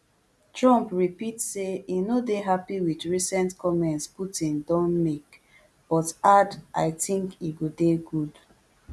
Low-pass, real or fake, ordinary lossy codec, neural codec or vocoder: none; real; none; none